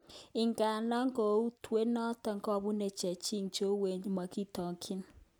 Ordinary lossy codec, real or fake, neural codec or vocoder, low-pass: none; real; none; none